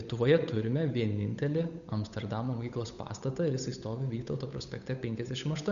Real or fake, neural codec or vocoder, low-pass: fake; codec, 16 kHz, 8 kbps, FunCodec, trained on Chinese and English, 25 frames a second; 7.2 kHz